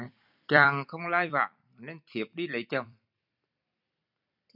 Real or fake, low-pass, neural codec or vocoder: fake; 5.4 kHz; vocoder, 44.1 kHz, 128 mel bands every 256 samples, BigVGAN v2